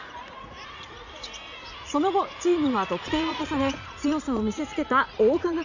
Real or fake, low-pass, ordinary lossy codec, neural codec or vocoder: fake; 7.2 kHz; none; vocoder, 44.1 kHz, 80 mel bands, Vocos